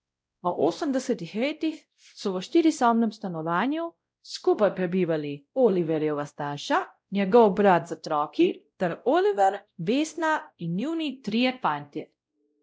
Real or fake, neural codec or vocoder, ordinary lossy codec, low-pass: fake; codec, 16 kHz, 0.5 kbps, X-Codec, WavLM features, trained on Multilingual LibriSpeech; none; none